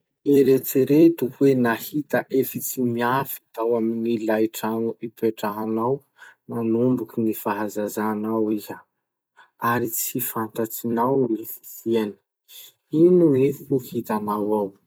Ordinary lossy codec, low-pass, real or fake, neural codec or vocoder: none; none; fake; vocoder, 44.1 kHz, 128 mel bands every 256 samples, BigVGAN v2